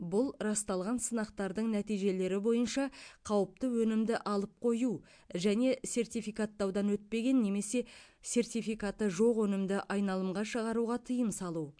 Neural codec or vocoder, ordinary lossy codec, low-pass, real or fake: none; MP3, 64 kbps; 9.9 kHz; real